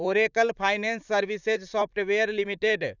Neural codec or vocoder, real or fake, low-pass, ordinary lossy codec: vocoder, 44.1 kHz, 128 mel bands, Pupu-Vocoder; fake; 7.2 kHz; none